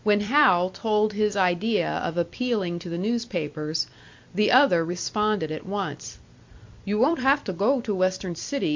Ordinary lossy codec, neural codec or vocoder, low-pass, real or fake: MP3, 48 kbps; vocoder, 44.1 kHz, 128 mel bands every 256 samples, BigVGAN v2; 7.2 kHz; fake